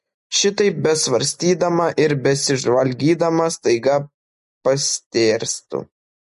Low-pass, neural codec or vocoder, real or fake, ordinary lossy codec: 14.4 kHz; none; real; MP3, 48 kbps